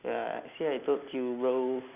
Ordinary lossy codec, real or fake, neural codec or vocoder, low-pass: none; real; none; 3.6 kHz